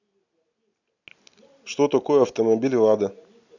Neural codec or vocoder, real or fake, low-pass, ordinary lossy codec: none; real; 7.2 kHz; none